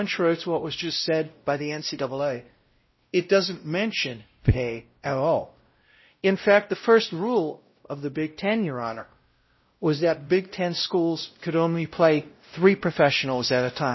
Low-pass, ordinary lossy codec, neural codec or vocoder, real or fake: 7.2 kHz; MP3, 24 kbps; codec, 16 kHz, 0.5 kbps, X-Codec, WavLM features, trained on Multilingual LibriSpeech; fake